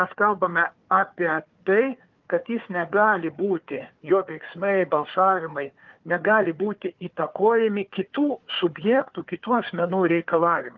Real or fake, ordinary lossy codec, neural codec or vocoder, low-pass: fake; Opus, 32 kbps; codec, 16 kHz, 4 kbps, FunCodec, trained on Chinese and English, 50 frames a second; 7.2 kHz